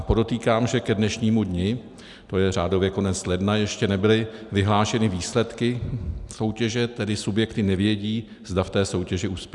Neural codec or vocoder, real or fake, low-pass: none; real; 10.8 kHz